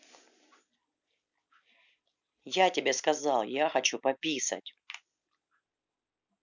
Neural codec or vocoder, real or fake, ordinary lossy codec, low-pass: none; real; none; 7.2 kHz